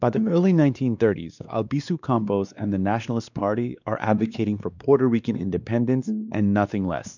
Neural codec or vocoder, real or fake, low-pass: codec, 16 kHz, 2 kbps, X-Codec, WavLM features, trained on Multilingual LibriSpeech; fake; 7.2 kHz